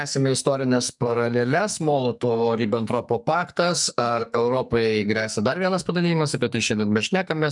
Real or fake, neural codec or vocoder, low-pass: fake; codec, 44.1 kHz, 2.6 kbps, SNAC; 10.8 kHz